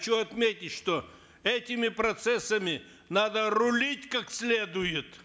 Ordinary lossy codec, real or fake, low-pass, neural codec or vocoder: none; real; none; none